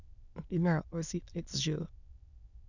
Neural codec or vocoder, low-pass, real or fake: autoencoder, 22.05 kHz, a latent of 192 numbers a frame, VITS, trained on many speakers; 7.2 kHz; fake